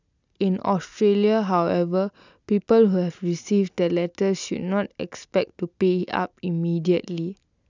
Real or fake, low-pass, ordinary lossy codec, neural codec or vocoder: real; 7.2 kHz; none; none